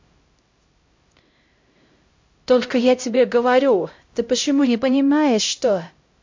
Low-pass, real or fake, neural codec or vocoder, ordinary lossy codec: 7.2 kHz; fake; codec, 16 kHz, 0.5 kbps, X-Codec, HuBERT features, trained on LibriSpeech; MP3, 48 kbps